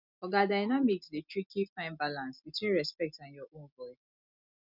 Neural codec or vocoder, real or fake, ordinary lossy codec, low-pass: none; real; none; 5.4 kHz